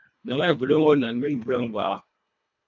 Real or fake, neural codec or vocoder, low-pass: fake; codec, 24 kHz, 1.5 kbps, HILCodec; 7.2 kHz